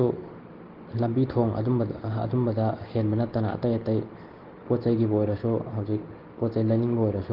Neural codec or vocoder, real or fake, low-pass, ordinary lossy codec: none; real; 5.4 kHz; Opus, 16 kbps